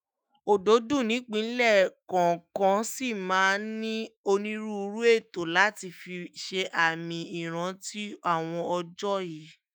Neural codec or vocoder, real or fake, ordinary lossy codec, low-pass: autoencoder, 48 kHz, 128 numbers a frame, DAC-VAE, trained on Japanese speech; fake; none; none